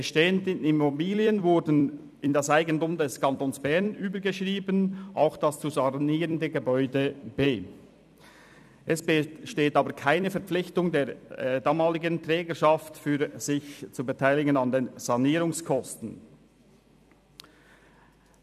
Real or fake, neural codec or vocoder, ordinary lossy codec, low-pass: fake; vocoder, 44.1 kHz, 128 mel bands every 256 samples, BigVGAN v2; none; 14.4 kHz